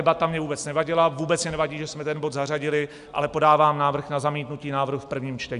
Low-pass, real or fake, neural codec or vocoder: 10.8 kHz; real; none